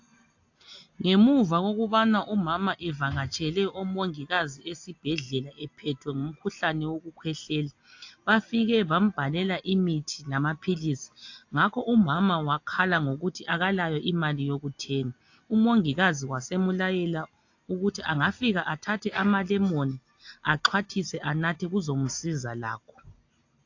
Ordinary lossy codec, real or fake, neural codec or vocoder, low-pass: AAC, 48 kbps; real; none; 7.2 kHz